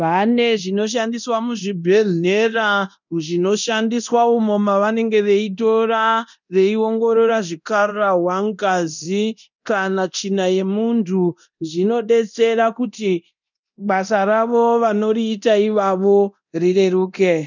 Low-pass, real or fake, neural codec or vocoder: 7.2 kHz; fake; codec, 24 kHz, 0.9 kbps, DualCodec